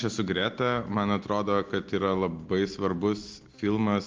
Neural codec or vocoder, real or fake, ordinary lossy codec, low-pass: none; real; Opus, 24 kbps; 7.2 kHz